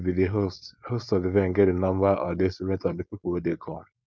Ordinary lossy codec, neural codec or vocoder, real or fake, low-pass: none; codec, 16 kHz, 4.8 kbps, FACodec; fake; none